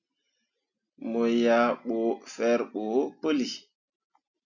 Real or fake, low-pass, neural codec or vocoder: real; 7.2 kHz; none